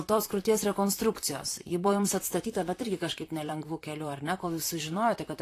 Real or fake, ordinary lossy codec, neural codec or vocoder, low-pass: fake; AAC, 48 kbps; vocoder, 44.1 kHz, 128 mel bands, Pupu-Vocoder; 14.4 kHz